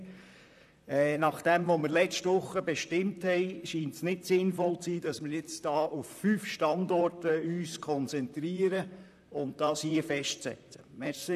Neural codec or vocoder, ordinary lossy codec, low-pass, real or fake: vocoder, 44.1 kHz, 128 mel bands, Pupu-Vocoder; none; 14.4 kHz; fake